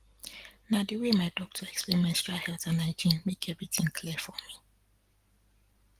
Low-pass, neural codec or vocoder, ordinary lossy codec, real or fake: 14.4 kHz; none; Opus, 24 kbps; real